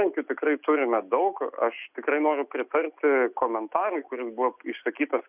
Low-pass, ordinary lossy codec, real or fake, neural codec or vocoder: 3.6 kHz; Opus, 64 kbps; real; none